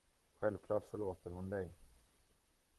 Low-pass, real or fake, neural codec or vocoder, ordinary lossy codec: 14.4 kHz; fake; vocoder, 44.1 kHz, 128 mel bands, Pupu-Vocoder; Opus, 32 kbps